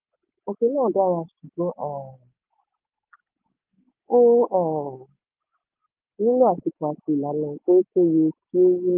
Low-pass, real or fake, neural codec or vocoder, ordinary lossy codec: 3.6 kHz; real; none; Opus, 24 kbps